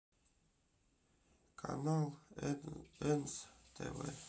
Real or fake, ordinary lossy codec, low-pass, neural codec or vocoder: real; none; none; none